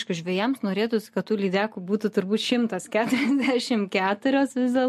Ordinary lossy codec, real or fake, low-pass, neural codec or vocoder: MP3, 64 kbps; real; 14.4 kHz; none